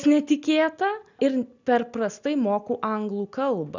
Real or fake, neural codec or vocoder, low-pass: real; none; 7.2 kHz